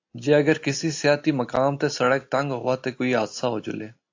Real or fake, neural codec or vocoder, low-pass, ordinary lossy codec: real; none; 7.2 kHz; AAC, 48 kbps